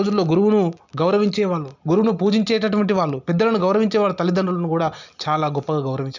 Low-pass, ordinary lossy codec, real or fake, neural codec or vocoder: 7.2 kHz; none; real; none